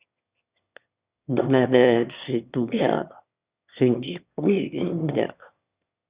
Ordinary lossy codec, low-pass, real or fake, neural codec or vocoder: Opus, 64 kbps; 3.6 kHz; fake; autoencoder, 22.05 kHz, a latent of 192 numbers a frame, VITS, trained on one speaker